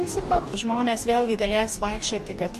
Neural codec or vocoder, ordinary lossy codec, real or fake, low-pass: codec, 44.1 kHz, 2.6 kbps, DAC; MP3, 64 kbps; fake; 14.4 kHz